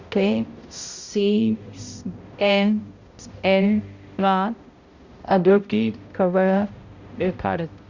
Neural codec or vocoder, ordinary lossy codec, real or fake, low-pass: codec, 16 kHz, 0.5 kbps, X-Codec, HuBERT features, trained on balanced general audio; Opus, 64 kbps; fake; 7.2 kHz